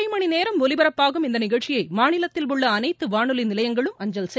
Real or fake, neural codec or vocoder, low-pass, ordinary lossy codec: real; none; none; none